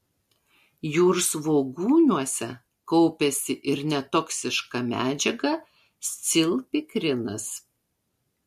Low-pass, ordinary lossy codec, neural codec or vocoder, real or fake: 14.4 kHz; MP3, 64 kbps; none; real